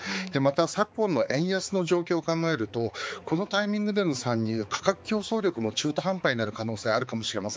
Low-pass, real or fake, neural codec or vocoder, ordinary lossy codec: none; fake; codec, 16 kHz, 4 kbps, X-Codec, HuBERT features, trained on balanced general audio; none